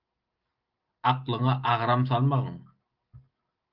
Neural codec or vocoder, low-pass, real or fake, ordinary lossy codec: none; 5.4 kHz; real; Opus, 32 kbps